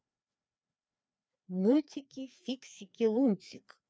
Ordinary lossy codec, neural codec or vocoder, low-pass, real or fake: none; codec, 16 kHz, 2 kbps, FreqCodec, larger model; none; fake